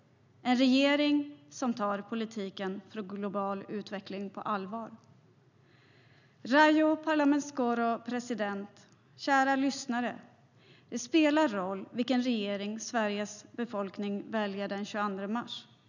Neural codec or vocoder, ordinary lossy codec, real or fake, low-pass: none; none; real; 7.2 kHz